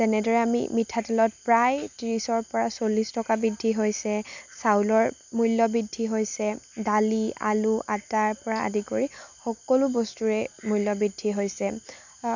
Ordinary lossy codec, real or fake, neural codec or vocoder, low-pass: none; real; none; 7.2 kHz